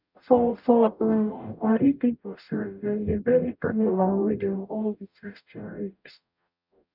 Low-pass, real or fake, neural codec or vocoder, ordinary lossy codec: 5.4 kHz; fake; codec, 44.1 kHz, 0.9 kbps, DAC; none